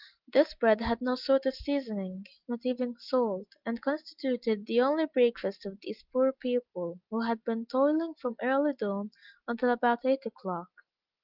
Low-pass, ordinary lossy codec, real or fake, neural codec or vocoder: 5.4 kHz; Opus, 32 kbps; real; none